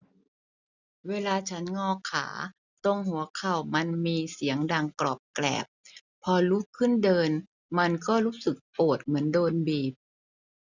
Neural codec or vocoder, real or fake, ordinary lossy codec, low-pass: none; real; none; 7.2 kHz